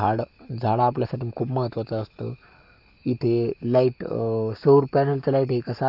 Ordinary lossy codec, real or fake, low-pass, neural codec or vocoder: none; fake; 5.4 kHz; codec, 44.1 kHz, 7.8 kbps, Pupu-Codec